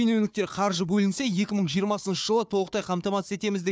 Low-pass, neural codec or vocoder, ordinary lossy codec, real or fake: none; codec, 16 kHz, 4 kbps, FunCodec, trained on Chinese and English, 50 frames a second; none; fake